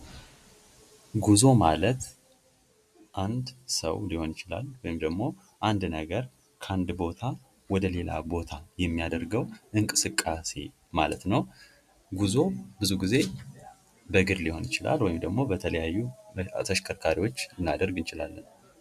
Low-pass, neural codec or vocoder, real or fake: 14.4 kHz; none; real